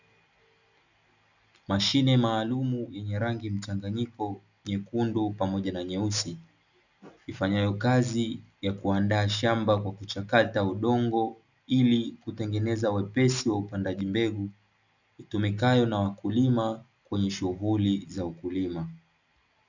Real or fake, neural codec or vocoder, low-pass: real; none; 7.2 kHz